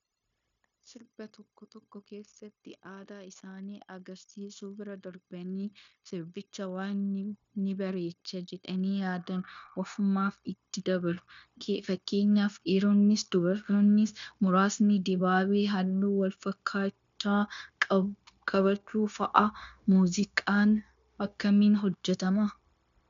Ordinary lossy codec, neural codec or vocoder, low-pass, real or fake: MP3, 64 kbps; codec, 16 kHz, 0.9 kbps, LongCat-Audio-Codec; 7.2 kHz; fake